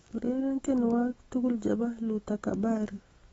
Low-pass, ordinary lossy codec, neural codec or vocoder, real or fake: 19.8 kHz; AAC, 24 kbps; autoencoder, 48 kHz, 128 numbers a frame, DAC-VAE, trained on Japanese speech; fake